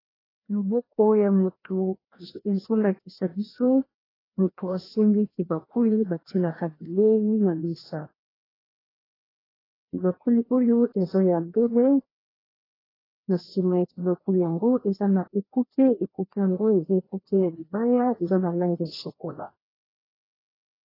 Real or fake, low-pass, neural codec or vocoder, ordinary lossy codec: fake; 5.4 kHz; codec, 16 kHz, 1 kbps, FreqCodec, larger model; AAC, 24 kbps